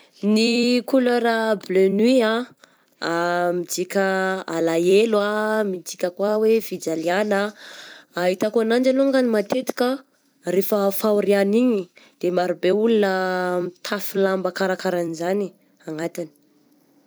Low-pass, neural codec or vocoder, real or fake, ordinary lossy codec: none; vocoder, 44.1 kHz, 128 mel bands every 256 samples, BigVGAN v2; fake; none